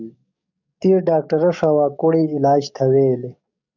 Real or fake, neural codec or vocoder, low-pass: fake; codec, 44.1 kHz, 7.8 kbps, DAC; 7.2 kHz